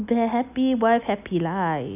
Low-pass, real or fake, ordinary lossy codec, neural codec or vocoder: 3.6 kHz; real; none; none